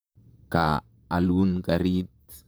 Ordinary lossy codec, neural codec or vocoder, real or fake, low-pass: none; vocoder, 44.1 kHz, 128 mel bands, Pupu-Vocoder; fake; none